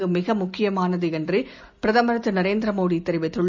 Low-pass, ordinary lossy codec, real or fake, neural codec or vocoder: none; none; real; none